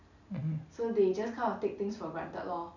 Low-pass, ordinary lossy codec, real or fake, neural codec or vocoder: 7.2 kHz; none; real; none